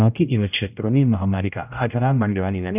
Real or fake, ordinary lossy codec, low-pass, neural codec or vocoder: fake; none; 3.6 kHz; codec, 16 kHz, 0.5 kbps, X-Codec, HuBERT features, trained on general audio